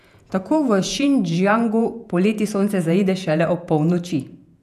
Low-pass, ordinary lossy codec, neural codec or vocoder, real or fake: 14.4 kHz; none; vocoder, 48 kHz, 128 mel bands, Vocos; fake